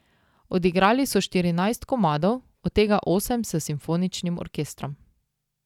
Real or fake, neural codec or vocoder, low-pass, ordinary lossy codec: real; none; 19.8 kHz; none